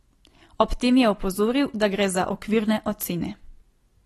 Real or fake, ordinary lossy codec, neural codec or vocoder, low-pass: real; AAC, 32 kbps; none; 19.8 kHz